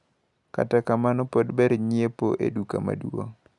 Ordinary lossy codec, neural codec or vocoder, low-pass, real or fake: none; none; 10.8 kHz; real